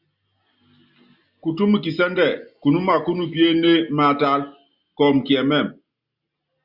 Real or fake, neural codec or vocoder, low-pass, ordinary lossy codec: real; none; 5.4 kHz; Opus, 64 kbps